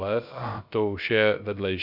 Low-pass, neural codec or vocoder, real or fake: 5.4 kHz; codec, 16 kHz, about 1 kbps, DyCAST, with the encoder's durations; fake